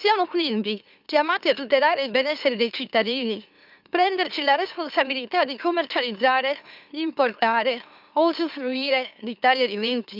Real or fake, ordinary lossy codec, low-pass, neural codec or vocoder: fake; none; 5.4 kHz; autoencoder, 44.1 kHz, a latent of 192 numbers a frame, MeloTTS